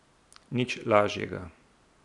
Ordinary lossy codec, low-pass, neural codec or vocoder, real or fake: none; 10.8 kHz; none; real